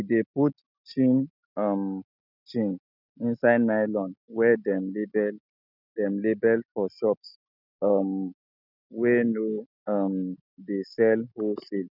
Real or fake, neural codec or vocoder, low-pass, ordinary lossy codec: real; none; 5.4 kHz; none